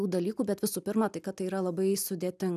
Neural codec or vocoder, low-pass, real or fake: none; 14.4 kHz; real